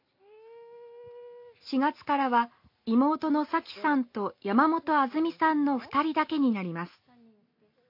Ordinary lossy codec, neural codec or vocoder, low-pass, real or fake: MP3, 32 kbps; none; 5.4 kHz; real